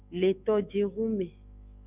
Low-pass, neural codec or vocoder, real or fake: 3.6 kHz; autoencoder, 48 kHz, 128 numbers a frame, DAC-VAE, trained on Japanese speech; fake